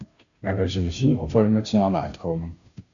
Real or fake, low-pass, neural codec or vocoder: fake; 7.2 kHz; codec, 16 kHz, 0.5 kbps, FunCodec, trained on Chinese and English, 25 frames a second